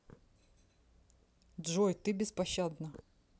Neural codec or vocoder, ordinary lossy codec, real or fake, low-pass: none; none; real; none